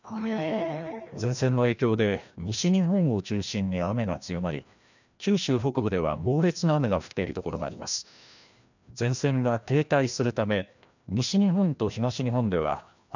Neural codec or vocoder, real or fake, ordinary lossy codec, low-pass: codec, 16 kHz, 1 kbps, FreqCodec, larger model; fake; none; 7.2 kHz